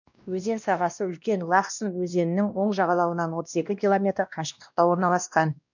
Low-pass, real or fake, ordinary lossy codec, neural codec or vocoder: 7.2 kHz; fake; none; codec, 16 kHz, 1 kbps, X-Codec, WavLM features, trained on Multilingual LibriSpeech